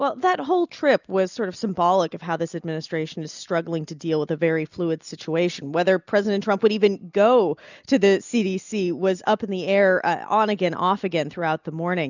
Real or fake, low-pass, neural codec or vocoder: real; 7.2 kHz; none